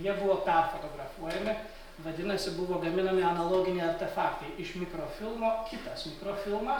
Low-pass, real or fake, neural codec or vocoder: 19.8 kHz; real; none